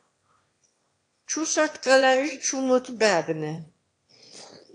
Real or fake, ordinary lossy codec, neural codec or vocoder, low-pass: fake; AAC, 48 kbps; autoencoder, 22.05 kHz, a latent of 192 numbers a frame, VITS, trained on one speaker; 9.9 kHz